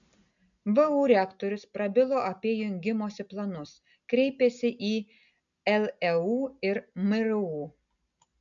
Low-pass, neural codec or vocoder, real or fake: 7.2 kHz; none; real